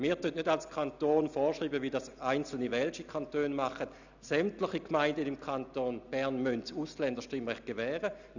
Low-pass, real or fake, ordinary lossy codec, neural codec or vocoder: 7.2 kHz; real; none; none